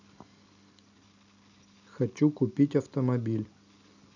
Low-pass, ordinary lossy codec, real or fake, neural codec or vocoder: 7.2 kHz; none; real; none